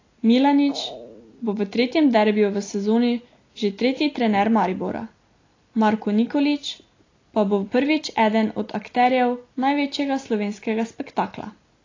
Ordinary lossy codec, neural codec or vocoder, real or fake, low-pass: AAC, 32 kbps; none; real; 7.2 kHz